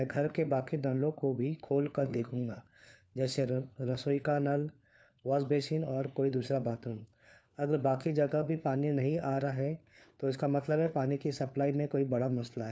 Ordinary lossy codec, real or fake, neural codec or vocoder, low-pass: none; fake; codec, 16 kHz, 4 kbps, FunCodec, trained on LibriTTS, 50 frames a second; none